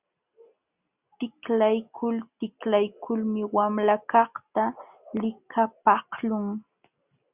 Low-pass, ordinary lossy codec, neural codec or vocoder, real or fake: 3.6 kHz; Opus, 64 kbps; none; real